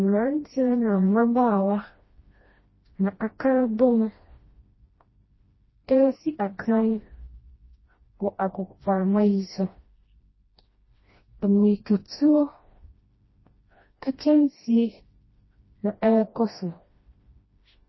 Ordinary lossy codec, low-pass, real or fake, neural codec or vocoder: MP3, 24 kbps; 7.2 kHz; fake; codec, 16 kHz, 1 kbps, FreqCodec, smaller model